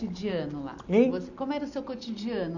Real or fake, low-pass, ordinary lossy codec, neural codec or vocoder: real; 7.2 kHz; none; none